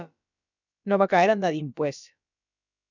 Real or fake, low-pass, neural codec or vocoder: fake; 7.2 kHz; codec, 16 kHz, about 1 kbps, DyCAST, with the encoder's durations